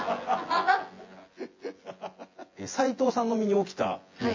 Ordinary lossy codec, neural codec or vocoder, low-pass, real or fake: MP3, 32 kbps; vocoder, 24 kHz, 100 mel bands, Vocos; 7.2 kHz; fake